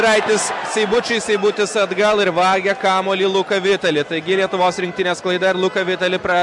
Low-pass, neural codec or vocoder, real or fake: 10.8 kHz; none; real